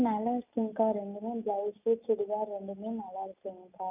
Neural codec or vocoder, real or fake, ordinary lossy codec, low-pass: none; real; none; 3.6 kHz